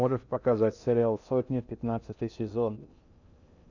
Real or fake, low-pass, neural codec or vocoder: fake; 7.2 kHz; codec, 16 kHz in and 24 kHz out, 0.6 kbps, FocalCodec, streaming, 2048 codes